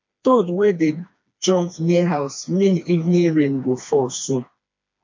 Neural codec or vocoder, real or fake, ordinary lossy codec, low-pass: codec, 16 kHz, 2 kbps, FreqCodec, smaller model; fake; MP3, 48 kbps; 7.2 kHz